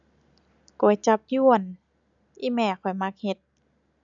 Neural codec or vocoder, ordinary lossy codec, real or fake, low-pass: none; none; real; 7.2 kHz